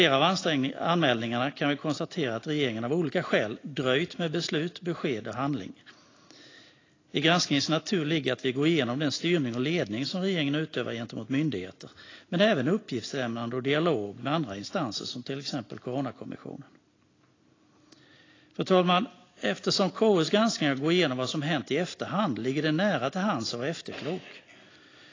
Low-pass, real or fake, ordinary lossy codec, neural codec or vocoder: 7.2 kHz; real; AAC, 32 kbps; none